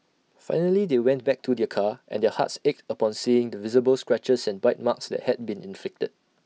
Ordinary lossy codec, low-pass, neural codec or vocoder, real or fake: none; none; none; real